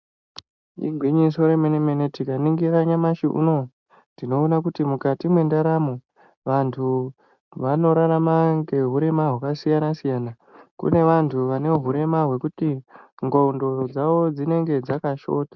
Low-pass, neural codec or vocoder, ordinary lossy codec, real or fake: 7.2 kHz; none; MP3, 64 kbps; real